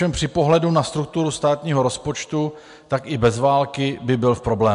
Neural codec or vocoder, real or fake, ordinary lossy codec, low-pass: none; real; MP3, 64 kbps; 10.8 kHz